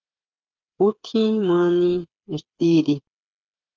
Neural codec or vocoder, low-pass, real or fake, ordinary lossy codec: codec, 16 kHz, 8 kbps, FreqCodec, smaller model; 7.2 kHz; fake; Opus, 24 kbps